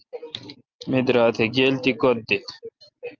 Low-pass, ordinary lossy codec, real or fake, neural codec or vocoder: 7.2 kHz; Opus, 24 kbps; real; none